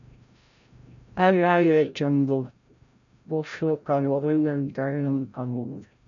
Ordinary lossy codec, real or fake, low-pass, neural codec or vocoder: MP3, 96 kbps; fake; 7.2 kHz; codec, 16 kHz, 0.5 kbps, FreqCodec, larger model